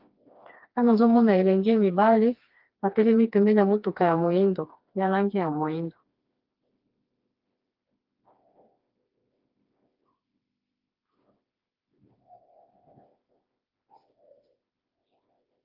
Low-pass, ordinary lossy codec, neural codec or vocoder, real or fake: 5.4 kHz; Opus, 32 kbps; codec, 16 kHz, 2 kbps, FreqCodec, smaller model; fake